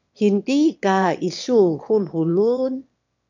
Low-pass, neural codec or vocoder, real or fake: 7.2 kHz; autoencoder, 22.05 kHz, a latent of 192 numbers a frame, VITS, trained on one speaker; fake